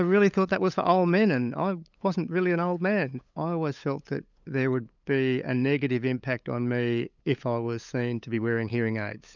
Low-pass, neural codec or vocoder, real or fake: 7.2 kHz; codec, 16 kHz, 8 kbps, FunCodec, trained on LibriTTS, 25 frames a second; fake